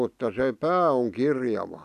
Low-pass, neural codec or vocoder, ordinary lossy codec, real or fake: 14.4 kHz; none; none; real